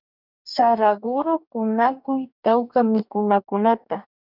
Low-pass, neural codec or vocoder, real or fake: 5.4 kHz; codec, 44.1 kHz, 2.6 kbps, DAC; fake